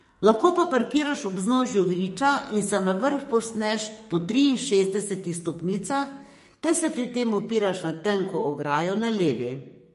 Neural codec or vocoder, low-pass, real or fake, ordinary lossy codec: codec, 44.1 kHz, 2.6 kbps, SNAC; 14.4 kHz; fake; MP3, 48 kbps